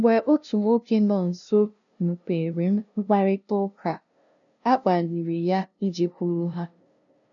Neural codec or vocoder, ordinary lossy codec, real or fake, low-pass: codec, 16 kHz, 0.5 kbps, FunCodec, trained on LibriTTS, 25 frames a second; none; fake; 7.2 kHz